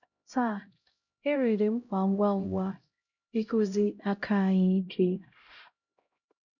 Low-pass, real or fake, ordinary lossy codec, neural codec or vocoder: 7.2 kHz; fake; none; codec, 16 kHz, 0.5 kbps, X-Codec, HuBERT features, trained on LibriSpeech